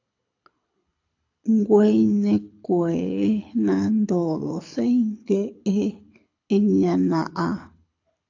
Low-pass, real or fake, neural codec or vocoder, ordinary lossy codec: 7.2 kHz; fake; codec, 24 kHz, 6 kbps, HILCodec; AAC, 48 kbps